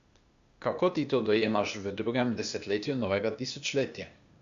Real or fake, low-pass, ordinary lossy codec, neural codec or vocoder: fake; 7.2 kHz; Opus, 64 kbps; codec, 16 kHz, 0.8 kbps, ZipCodec